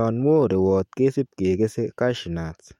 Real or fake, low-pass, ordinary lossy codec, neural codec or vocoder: fake; 19.8 kHz; MP3, 64 kbps; autoencoder, 48 kHz, 128 numbers a frame, DAC-VAE, trained on Japanese speech